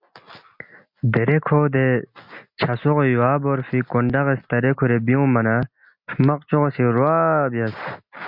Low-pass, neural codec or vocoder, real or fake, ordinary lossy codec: 5.4 kHz; none; real; MP3, 48 kbps